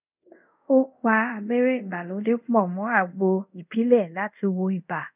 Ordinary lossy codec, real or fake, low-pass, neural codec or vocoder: none; fake; 3.6 kHz; codec, 24 kHz, 0.5 kbps, DualCodec